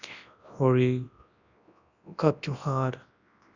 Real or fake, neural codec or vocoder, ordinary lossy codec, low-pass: fake; codec, 24 kHz, 0.9 kbps, WavTokenizer, large speech release; none; 7.2 kHz